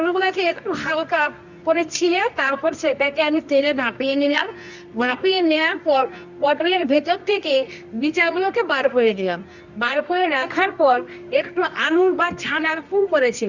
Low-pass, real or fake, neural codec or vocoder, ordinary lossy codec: 7.2 kHz; fake; codec, 24 kHz, 0.9 kbps, WavTokenizer, medium music audio release; Opus, 64 kbps